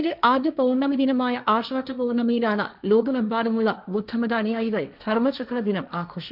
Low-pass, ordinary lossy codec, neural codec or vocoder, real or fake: 5.4 kHz; none; codec, 16 kHz, 1.1 kbps, Voila-Tokenizer; fake